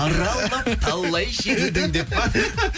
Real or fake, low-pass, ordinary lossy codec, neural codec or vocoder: real; none; none; none